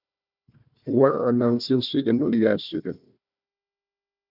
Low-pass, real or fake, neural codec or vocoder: 5.4 kHz; fake; codec, 16 kHz, 1 kbps, FunCodec, trained on Chinese and English, 50 frames a second